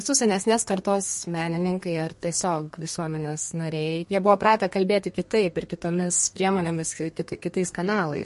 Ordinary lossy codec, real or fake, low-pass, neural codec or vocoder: MP3, 48 kbps; fake; 14.4 kHz; codec, 32 kHz, 1.9 kbps, SNAC